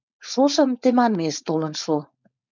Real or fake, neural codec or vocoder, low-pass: fake; codec, 16 kHz, 4.8 kbps, FACodec; 7.2 kHz